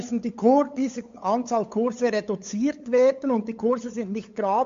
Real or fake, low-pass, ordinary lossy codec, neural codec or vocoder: fake; 7.2 kHz; MP3, 48 kbps; codec, 16 kHz, 8 kbps, FunCodec, trained on LibriTTS, 25 frames a second